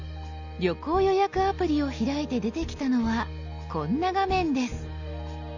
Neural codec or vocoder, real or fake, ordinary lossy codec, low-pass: none; real; none; 7.2 kHz